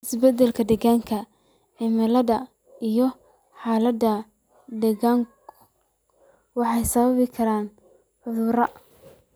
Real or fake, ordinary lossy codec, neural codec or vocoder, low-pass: real; none; none; none